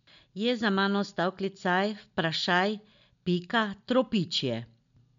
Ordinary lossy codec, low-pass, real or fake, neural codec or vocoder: MP3, 64 kbps; 7.2 kHz; real; none